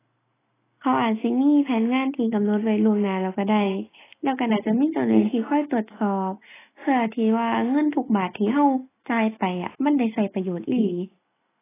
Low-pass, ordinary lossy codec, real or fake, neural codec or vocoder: 3.6 kHz; AAC, 16 kbps; real; none